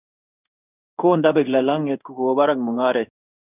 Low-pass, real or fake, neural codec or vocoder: 3.6 kHz; fake; codec, 16 kHz in and 24 kHz out, 1 kbps, XY-Tokenizer